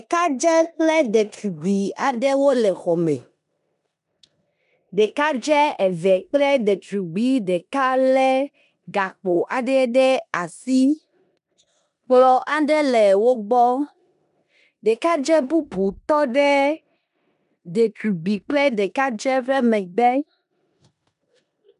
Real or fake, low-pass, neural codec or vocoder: fake; 10.8 kHz; codec, 16 kHz in and 24 kHz out, 0.9 kbps, LongCat-Audio-Codec, four codebook decoder